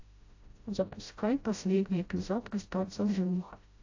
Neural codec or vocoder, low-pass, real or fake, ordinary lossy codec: codec, 16 kHz, 0.5 kbps, FreqCodec, smaller model; 7.2 kHz; fake; AAC, 48 kbps